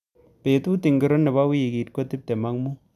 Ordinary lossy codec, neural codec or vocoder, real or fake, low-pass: none; none; real; 14.4 kHz